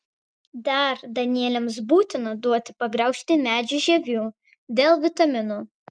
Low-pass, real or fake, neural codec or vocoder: 9.9 kHz; real; none